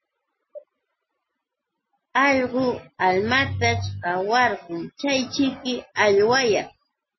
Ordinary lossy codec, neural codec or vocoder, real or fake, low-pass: MP3, 24 kbps; none; real; 7.2 kHz